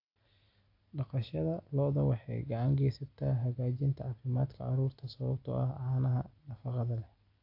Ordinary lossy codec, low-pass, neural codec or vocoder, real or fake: AAC, 48 kbps; 5.4 kHz; none; real